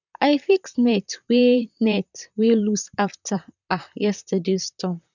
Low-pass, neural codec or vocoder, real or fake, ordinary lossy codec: 7.2 kHz; vocoder, 44.1 kHz, 128 mel bands, Pupu-Vocoder; fake; none